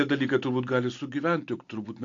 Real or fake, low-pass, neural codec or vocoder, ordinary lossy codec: real; 7.2 kHz; none; AAC, 48 kbps